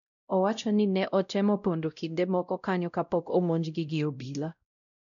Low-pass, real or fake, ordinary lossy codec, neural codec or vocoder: 7.2 kHz; fake; none; codec, 16 kHz, 0.5 kbps, X-Codec, WavLM features, trained on Multilingual LibriSpeech